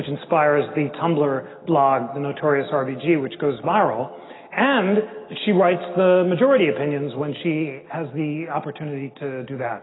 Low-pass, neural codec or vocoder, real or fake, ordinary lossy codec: 7.2 kHz; none; real; AAC, 16 kbps